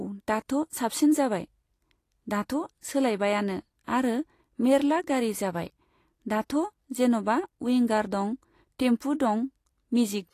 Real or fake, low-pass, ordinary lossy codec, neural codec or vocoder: real; 14.4 kHz; AAC, 48 kbps; none